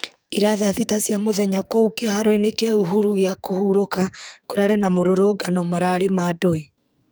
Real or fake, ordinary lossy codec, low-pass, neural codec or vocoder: fake; none; none; codec, 44.1 kHz, 2.6 kbps, SNAC